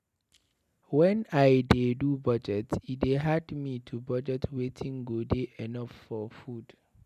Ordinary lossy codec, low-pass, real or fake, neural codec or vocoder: none; 14.4 kHz; real; none